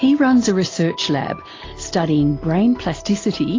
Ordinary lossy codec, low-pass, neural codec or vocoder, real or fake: AAC, 32 kbps; 7.2 kHz; none; real